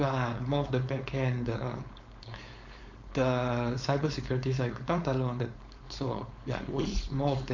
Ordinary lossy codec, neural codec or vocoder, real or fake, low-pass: MP3, 48 kbps; codec, 16 kHz, 4.8 kbps, FACodec; fake; 7.2 kHz